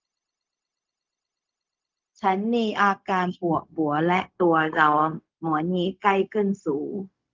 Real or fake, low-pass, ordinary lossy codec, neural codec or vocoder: fake; 7.2 kHz; Opus, 24 kbps; codec, 16 kHz, 0.4 kbps, LongCat-Audio-Codec